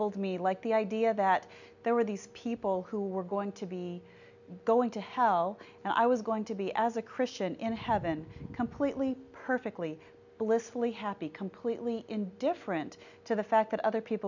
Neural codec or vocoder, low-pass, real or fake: none; 7.2 kHz; real